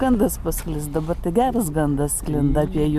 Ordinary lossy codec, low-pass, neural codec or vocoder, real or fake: MP3, 96 kbps; 14.4 kHz; none; real